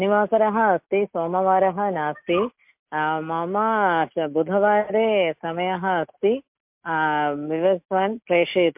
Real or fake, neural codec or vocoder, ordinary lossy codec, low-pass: real; none; MP3, 32 kbps; 3.6 kHz